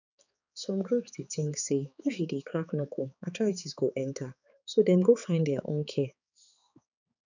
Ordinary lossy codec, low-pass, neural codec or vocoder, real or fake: none; 7.2 kHz; codec, 16 kHz, 4 kbps, X-Codec, HuBERT features, trained on balanced general audio; fake